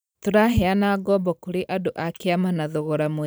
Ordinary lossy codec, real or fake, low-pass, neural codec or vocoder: none; fake; none; vocoder, 44.1 kHz, 128 mel bands every 512 samples, BigVGAN v2